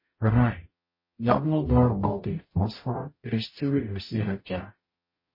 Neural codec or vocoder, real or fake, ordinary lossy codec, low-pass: codec, 44.1 kHz, 0.9 kbps, DAC; fake; MP3, 24 kbps; 5.4 kHz